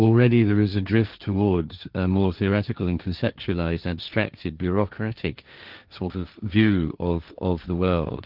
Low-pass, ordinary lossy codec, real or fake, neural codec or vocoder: 5.4 kHz; Opus, 24 kbps; fake; codec, 16 kHz, 1.1 kbps, Voila-Tokenizer